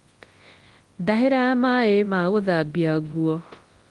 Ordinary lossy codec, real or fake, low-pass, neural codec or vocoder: Opus, 24 kbps; fake; 10.8 kHz; codec, 24 kHz, 0.9 kbps, WavTokenizer, large speech release